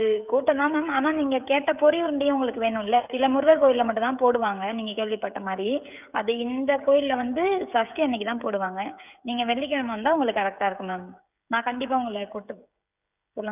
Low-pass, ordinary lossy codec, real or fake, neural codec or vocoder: 3.6 kHz; none; fake; codec, 16 kHz, 16 kbps, FreqCodec, smaller model